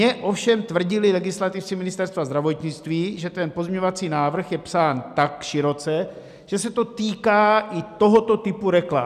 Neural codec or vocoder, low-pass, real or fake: none; 14.4 kHz; real